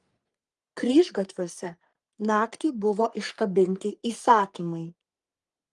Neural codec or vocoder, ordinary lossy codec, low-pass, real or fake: codec, 44.1 kHz, 3.4 kbps, Pupu-Codec; Opus, 32 kbps; 10.8 kHz; fake